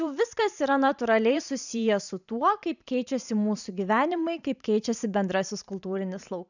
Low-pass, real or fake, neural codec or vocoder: 7.2 kHz; fake; vocoder, 44.1 kHz, 128 mel bands every 512 samples, BigVGAN v2